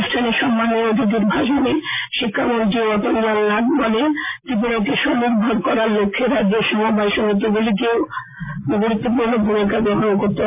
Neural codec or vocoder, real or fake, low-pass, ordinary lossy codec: none; real; 3.6 kHz; MP3, 24 kbps